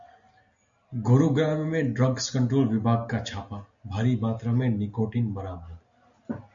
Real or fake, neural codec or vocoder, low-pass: real; none; 7.2 kHz